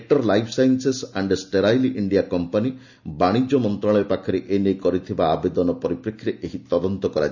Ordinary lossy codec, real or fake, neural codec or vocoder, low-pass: none; real; none; 7.2 kHz